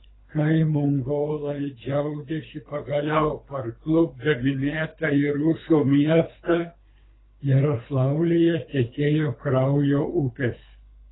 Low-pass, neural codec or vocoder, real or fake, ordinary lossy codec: 7.2 kHz; codec, 24 kHz, 3 kbps, HILCodec; fake; AAC, 16 kbps